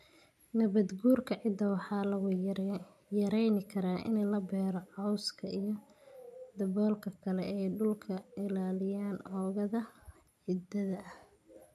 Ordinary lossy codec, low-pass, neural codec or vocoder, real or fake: none; 14.4 kHz; none; real